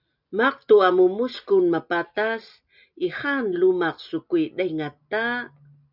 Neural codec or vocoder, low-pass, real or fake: none; 5.4 kHz; real